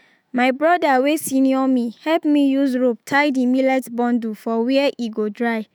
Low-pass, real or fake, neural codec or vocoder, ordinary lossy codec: none; fake; autoencoder, 48 kHz, 128 numbers a frame, DAC-VAE, trained on Japanese speech; none